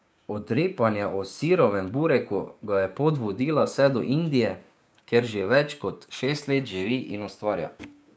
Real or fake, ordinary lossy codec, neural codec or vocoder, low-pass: fake; none; codec, 16 kHz, 6 kbps, DAC; none